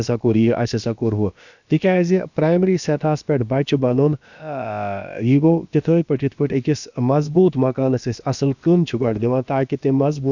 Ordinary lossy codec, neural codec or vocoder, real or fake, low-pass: none; codec, 16 kHz, about 1 kbps, DyCAST, with the encoder's durations; fake; 7.2 kHz